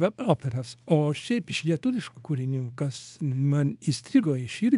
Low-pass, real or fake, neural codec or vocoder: 10.8 kHz; fake; codec, 24 kHz, 0.9 kbps, WavTokenizer, small release